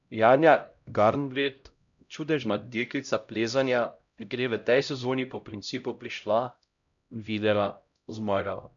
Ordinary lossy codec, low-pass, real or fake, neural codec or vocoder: none; 7.2 kHz; fake; codec, 16 kHz, 0.5 kbps, X-Codec, HuBERT features, trained on LibriSpeech